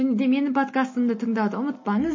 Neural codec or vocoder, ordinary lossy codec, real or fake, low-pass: none; MP3, 32 kbps; real; 7.2 kHz